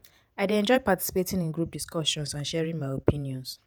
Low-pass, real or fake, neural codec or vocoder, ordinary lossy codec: none; fake; vocoder, 48 kHz, 128 mel bands, Vocos; none